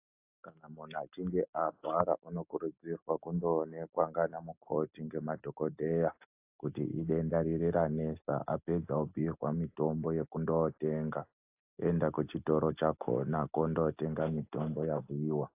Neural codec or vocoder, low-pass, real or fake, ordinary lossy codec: none; 3.6 kHz; real; AAC, 32 kbps